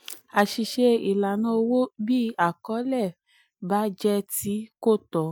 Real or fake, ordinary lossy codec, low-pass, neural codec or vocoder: real; none; none; none